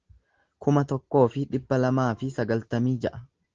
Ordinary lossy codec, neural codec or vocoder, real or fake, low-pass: Opus, 16 kbps; none; real; 7.2 kHz